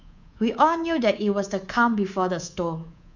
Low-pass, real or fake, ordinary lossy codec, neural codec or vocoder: 7.2 kHz; fake; none; codec, 24 kHz, 3.1 kbps, DualCodec